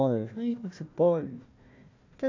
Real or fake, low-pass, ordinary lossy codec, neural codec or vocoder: fake; 7.2 kHz; AAC, 48 kbps; codec, 16 kHz, 1 kbps, FunCodec, trained on Chinese and English, 50 frames a second